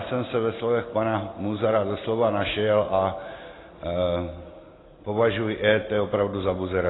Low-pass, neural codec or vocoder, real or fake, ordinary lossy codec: 7.2 kHz; none; real; AAC, 16 kbps